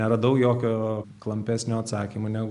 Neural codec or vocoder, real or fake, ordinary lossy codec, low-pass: none; real; MP3, 96 kbps; 10.8 kHz